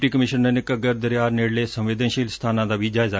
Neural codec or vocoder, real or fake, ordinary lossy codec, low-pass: none; real; none; none